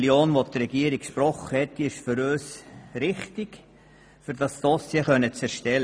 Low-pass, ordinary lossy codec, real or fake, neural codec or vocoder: none; none; real; none